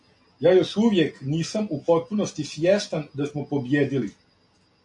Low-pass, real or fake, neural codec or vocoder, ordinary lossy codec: 10.8 kHz; real; none; AAC, 64 kbps